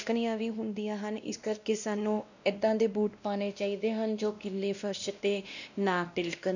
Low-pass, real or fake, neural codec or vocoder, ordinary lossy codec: 7.2 kHz; fake; codec, 16 kHz, 1 kbps, X-Codec, WavLM features, trained on Multilingual LibriSpeech; none